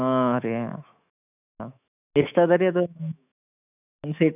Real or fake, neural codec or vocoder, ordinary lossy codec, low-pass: fake; autoencoder, 48 kHz, 128 numbers a frame, DAC-VAE, trained on Japanese speech; none; 3.6 kHz